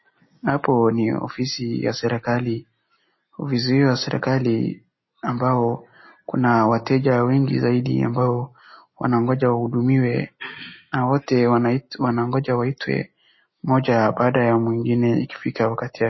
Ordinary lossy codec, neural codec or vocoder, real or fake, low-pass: MP3, 24 kbps; none; real; 7.2 kHz